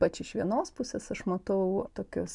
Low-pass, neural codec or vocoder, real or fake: 10.8 kHz; none; real